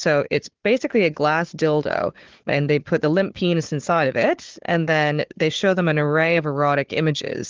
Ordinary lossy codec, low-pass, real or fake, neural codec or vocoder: Opus, 16 kbps; 7.2 kHz; fake; codec, 16 kHz, 4 kbps, FunCodec, trained on Chinese and English, 50 frames a second